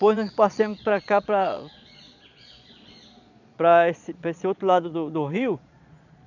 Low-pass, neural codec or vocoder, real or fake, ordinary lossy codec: 7.2 kHz; vocoder, 22.05 kHz, 80 mel bands, Vocos; fake; none